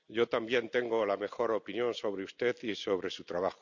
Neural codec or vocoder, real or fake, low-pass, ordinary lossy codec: none; real; 7.2 kHz; none